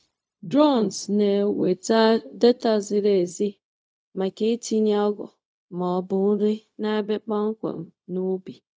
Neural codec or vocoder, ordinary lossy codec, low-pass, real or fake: codec, 16 kHz, 0.4 kbps, LongCat-Audio-Codec; none; none; fake